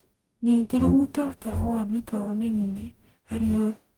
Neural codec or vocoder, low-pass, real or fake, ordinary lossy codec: codec, 44.1 kHz, 0.9 kbps, DAC; 19.8 kHz; fake; Opus, 32 kbps